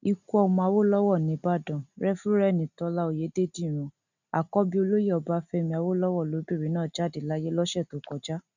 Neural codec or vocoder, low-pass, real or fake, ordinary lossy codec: none; 7.2 kHz; real; none